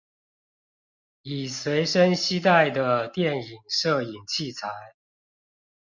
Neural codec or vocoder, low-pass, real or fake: none; 7.2 kHz; real